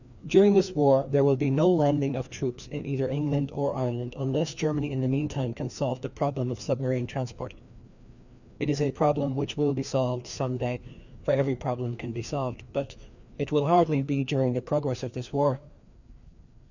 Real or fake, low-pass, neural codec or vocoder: fake; 7.2 kHz; codec, 16 kHz, 2 kbps, FreqCodec, larger model